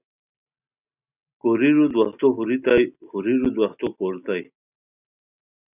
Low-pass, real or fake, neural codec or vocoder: 3.6 kHz; real; none